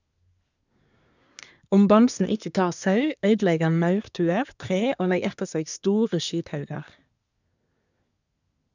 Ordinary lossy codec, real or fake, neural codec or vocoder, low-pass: none; fake; codec, 24 kHz, 1 kbps, SNAC; 7.2 kHz